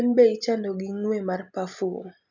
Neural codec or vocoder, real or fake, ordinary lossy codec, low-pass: none; real; none; 7.2 kHz